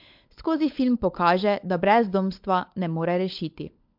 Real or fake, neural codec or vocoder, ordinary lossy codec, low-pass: fake; codec, 16 kHz, 16 kbps, FunCodec, trained on LibriTTS, 50 frames a second; MP3, 48 kbps; 5.4 kHz